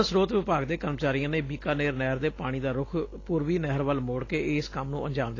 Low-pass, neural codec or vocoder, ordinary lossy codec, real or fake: 7.2 kHz; none; AAC, 32 kbps; real